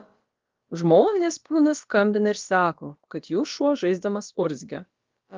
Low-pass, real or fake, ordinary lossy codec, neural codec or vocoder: 7.2 kHz; fake; Opus, 24 kbps; codec, 16 kHz, about 1 kbps, DyCAST, with the encoder's durations